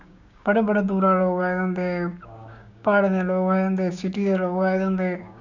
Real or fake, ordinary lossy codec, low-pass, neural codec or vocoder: fake; none; 7.2 kHz; codec, 16 kHz, 6 kbps, DAC